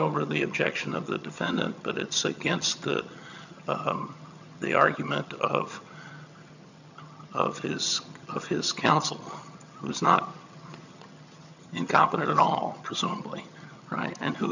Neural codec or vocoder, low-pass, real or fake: vocoder, 22.05 kHz, 80 mel bands, HiFi-GAN; 7.2 kHz; fake